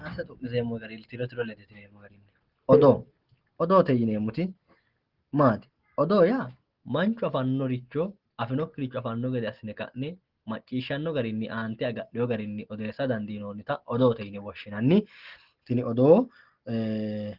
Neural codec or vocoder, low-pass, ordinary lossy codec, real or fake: none; 5.4 kHz; Opus, 16 kbps; real